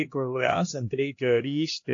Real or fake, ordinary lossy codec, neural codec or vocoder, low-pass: fake; AAC, 48 kbps; codec, 16 kHz, 1 kbps, X-Codec, HuBERT features, trained on LibriSpeech; 7.2 kHz